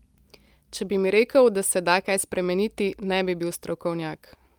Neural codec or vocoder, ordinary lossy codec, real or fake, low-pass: none; Opus, 32 kbps; real; 19.8 kHz